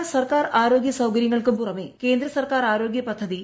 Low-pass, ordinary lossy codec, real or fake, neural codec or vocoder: none; none; real; none